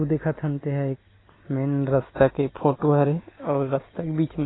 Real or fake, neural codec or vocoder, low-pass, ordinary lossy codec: real; none; 7.2 kHz; AAC, 16 kbps